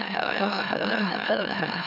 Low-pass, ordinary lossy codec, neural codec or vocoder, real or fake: 5.4 kHz; none; autoencoder, 44.1 kHz, a latent of 192 numbers a frame, MeloTTS; fake